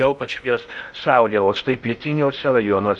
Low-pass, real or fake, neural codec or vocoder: 10.8 kHz; fake; codec, 16 kHz in and 24 kHz out, 0.6 kbps, FocalCodec, streaming, 2048 codes